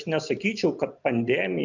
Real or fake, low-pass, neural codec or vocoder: real; 7.2 kHz; none